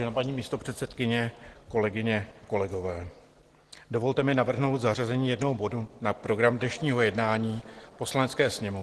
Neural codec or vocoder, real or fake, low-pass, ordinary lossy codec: none; real; 10.8 kHz; Opus, 16 kbps